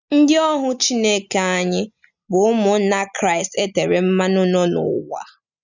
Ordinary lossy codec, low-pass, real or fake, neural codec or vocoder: none; 7.2 kHz; real; none